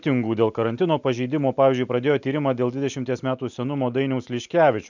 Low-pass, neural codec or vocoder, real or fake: 7.2 kHz; none; real